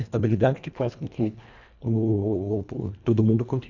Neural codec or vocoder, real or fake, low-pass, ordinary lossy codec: codec, 24 kHz, 1.5 kbps, HILCodec; fake; 7.2 kHz; none